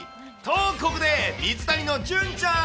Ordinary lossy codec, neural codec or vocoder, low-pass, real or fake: none; none; none; real